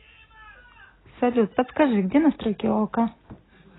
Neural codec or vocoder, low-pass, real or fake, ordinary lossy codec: none; 7.2 kHz; real; AAC, 16 kbps